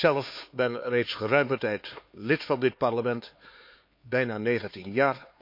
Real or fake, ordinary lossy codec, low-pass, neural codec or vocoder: fake; MP3, 32 kbps; 5.4 kHz; codec, 16 kHz, 4 kbps, X-Codec, HuBERT features, trained on LibriSpeech